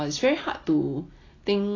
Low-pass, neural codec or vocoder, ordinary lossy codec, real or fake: 7.2 kHz; none; AAC, 32 kbps; real